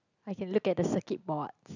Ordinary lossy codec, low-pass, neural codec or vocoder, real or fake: none; 7.2 kHz; none; real